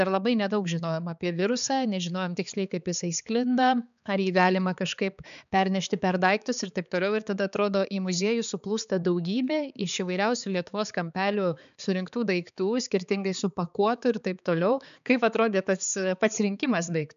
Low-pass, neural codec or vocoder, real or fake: 7.2 kHz; codec, 16 kHz, 4 kbps, X-Codec, HuBERT features, trained on balanced general audio; fake